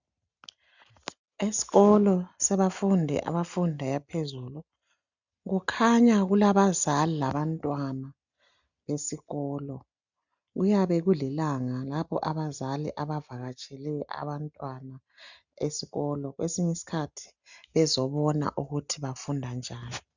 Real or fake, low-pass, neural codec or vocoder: real; 7.2 kHz; none